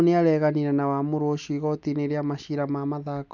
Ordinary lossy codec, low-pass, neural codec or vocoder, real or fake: none; 7.2 kHz; none; real